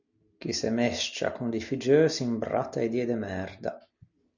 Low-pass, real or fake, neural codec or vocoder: 7.2 kHz; real; none